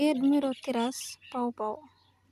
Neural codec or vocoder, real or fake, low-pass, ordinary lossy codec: none; real; 14.4 kHz; none